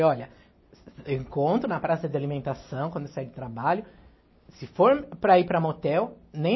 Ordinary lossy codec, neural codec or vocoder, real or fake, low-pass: MP3, 24 kbps; none; real; 7.2 kHz